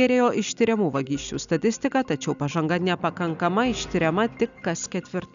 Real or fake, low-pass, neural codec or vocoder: real; 7.2 kHz; none